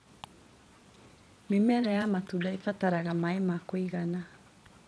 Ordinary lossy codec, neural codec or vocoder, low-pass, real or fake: none; vocoder, 22.05 kHz, 80 mel bands, Vocos; none; fake